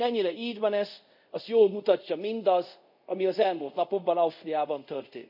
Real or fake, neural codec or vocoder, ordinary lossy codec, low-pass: fake; codec, 24 kHz, 0.5 kbps, DualCodec; none; 5.4 kHz